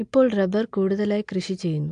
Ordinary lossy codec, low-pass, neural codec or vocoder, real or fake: AAC, 64 kbps; 9.9 kHz; none; real